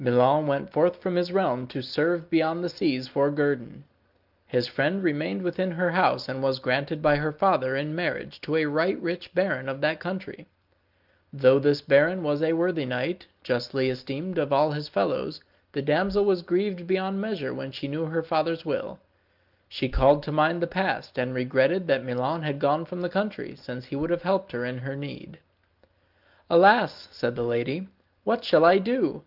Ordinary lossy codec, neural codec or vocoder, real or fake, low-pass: Opus, 24 kbps; none; real; 5.4 kHz